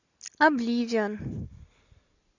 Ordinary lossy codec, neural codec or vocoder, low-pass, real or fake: AAC, 48 kbps; none; 7.2 kHz; real